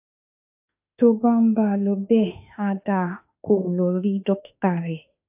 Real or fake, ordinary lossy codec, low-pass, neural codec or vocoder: fake; AAC, 32 kbps; 3.6 kHz; autoencoder, 48 kHz, 32 numbers a frame, DAC-VAE, trained on Japanese speech